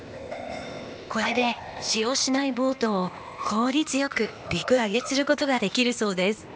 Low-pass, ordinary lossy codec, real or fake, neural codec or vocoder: none; none; fake; codec, 16 kHz, 0.8 kbps, ZipCodec